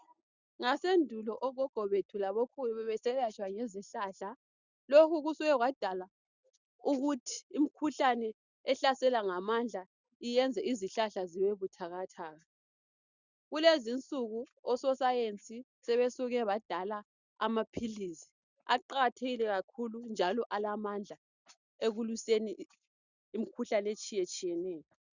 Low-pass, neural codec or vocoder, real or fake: 7.2 kHz; none; real